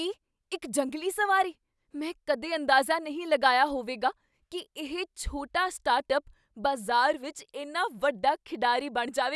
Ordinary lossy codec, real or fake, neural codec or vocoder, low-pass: none; real; none; none